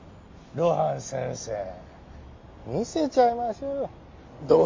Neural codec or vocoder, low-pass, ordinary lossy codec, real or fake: none; 7.2 kHz; MP3, 48 kbps; real